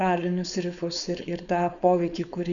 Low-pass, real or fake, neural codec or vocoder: 7.2 kHz; fake; codec, 16 kHz, 4.8 kbps, FACodec